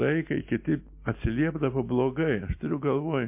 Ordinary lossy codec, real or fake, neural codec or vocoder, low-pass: MP3, 32 kbps; real; none; 3.6 kHz